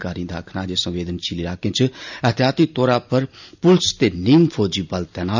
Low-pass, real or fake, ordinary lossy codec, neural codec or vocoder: 7.2 kHz; real; none; none